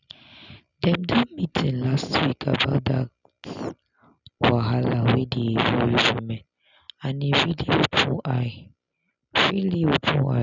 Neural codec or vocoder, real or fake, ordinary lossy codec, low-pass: none; real; none; 7.2 kHz